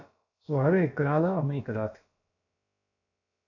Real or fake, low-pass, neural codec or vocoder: fake; 7.2 kHz; codec, 16 kHz, about 1 kbps, DyCAST, with the encoder's durations